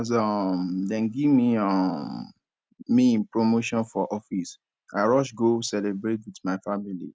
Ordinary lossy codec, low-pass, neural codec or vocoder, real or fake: none; none; none; real